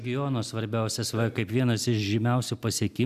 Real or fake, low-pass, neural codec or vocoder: real; 14.4 kHz; none